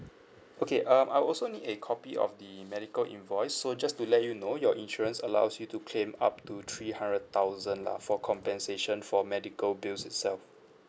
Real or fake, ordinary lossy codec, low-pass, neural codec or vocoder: real; none; none; none